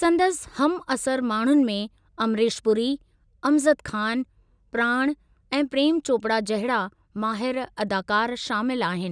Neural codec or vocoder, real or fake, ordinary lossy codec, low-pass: none; real; none; 9.9 kHz